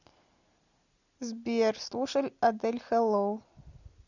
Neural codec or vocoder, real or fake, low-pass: none; real; 7.2 kHz